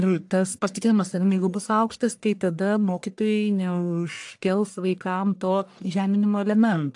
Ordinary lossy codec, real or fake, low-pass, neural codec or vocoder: AAC, 64 kbps; fake; 10.8 kHz; codec, 44.1 kHz, 1.7 kbps, Pupu-Codec